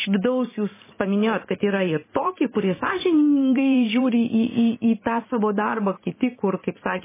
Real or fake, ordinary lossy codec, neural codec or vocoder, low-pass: fake; MP3, 16 kbps; vocoder, 44.1 kHz, 128 mel bands every 512 samples, BigVGAN v2; 3.6 kHz